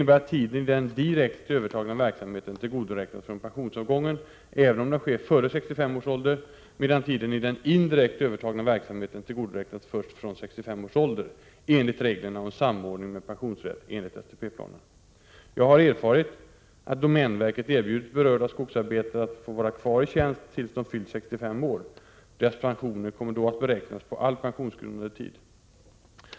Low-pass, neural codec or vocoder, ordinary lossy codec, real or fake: none; none; none; real